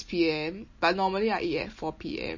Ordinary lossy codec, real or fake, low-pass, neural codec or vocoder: MP3, 32 kbps; real; 7.2 kHz; none